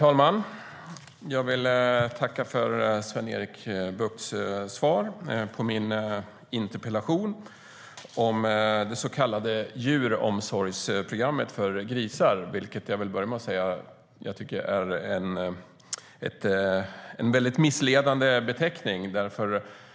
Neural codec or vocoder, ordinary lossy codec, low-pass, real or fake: none; none; none; real